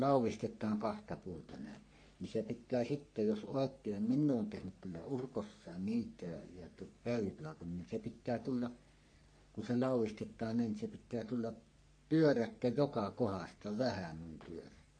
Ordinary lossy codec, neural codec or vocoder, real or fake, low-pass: MP3, 48 kbps; codec, 44.1 kHz, 3.4 kbps, Pupu-Codec; fake; 9.9 kHz